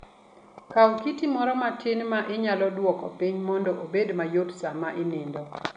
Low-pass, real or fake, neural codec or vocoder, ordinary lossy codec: 9.9 kHz; real; none; none